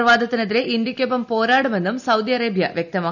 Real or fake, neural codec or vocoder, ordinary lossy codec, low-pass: real; none; none; 7.2 kHz